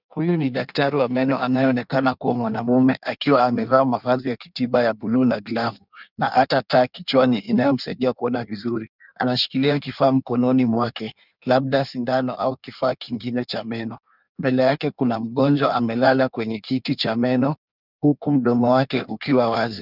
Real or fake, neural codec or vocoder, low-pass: fake; codec, 16 kHz in and 24 kHz out, 1.1 kbps, FireRedTTS-2 codec; 5.4 kHz